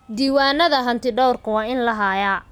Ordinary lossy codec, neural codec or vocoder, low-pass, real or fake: none; none; 19.8 kHz; real